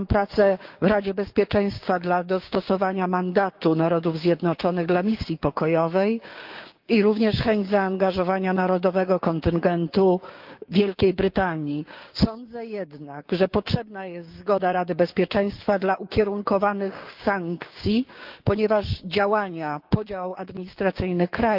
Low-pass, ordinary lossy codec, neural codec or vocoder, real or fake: 5.4 kHz; Opus, 32 kbps; codec, 16 kHz, 6 kbps, DAC; fake